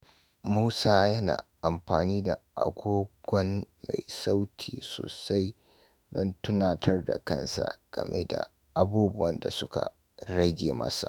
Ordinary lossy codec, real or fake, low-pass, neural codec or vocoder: none; fake; none; autoencoder, 48 kHz, 32 numbers a frame, DAC-VAE, trained on Japanese speech